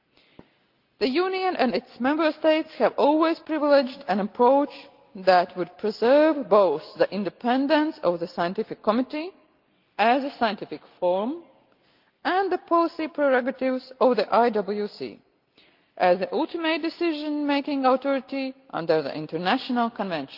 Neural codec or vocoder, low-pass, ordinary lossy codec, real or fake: none; 5.4 kHz; Opus, 24 kbps; real